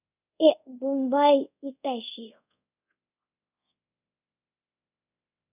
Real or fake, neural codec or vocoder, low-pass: fake; codec, 24 kHz, 0.5 kbps, DualCodec; 3.6 kHz